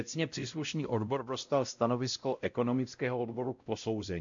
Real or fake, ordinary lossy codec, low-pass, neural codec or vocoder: fake; MP3, 64 kbps; 7.2 kHz; codec, 16 kHz, 0.5 kbps, X-Codec, WavLM features, trained on Multilingual LibriSpeech